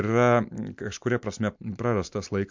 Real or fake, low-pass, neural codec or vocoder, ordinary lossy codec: fake; 7.2 kHz; vocoder, 44.1 kHz, 128 mel bands every 256 samples, BigVGAN v2; MP3, 64 kbps